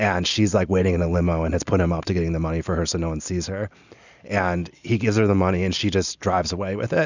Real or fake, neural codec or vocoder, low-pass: real; none; 7.2 kHz